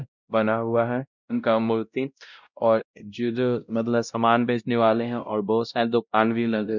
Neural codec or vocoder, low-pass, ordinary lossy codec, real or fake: codec, 16 kHz, 0.5 kbps, X-Codec, WavLM features, trained on Multilingual LibriSpeech; 7.2 kHz; none; fake